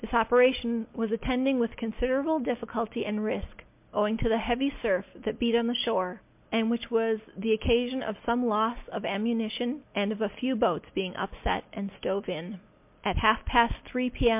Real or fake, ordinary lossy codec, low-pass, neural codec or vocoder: real; MP3, 32 kbps; 3.6 kHz; none